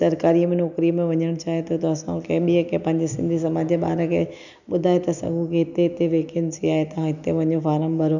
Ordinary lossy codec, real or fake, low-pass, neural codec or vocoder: none; real; 7.2 kHz; none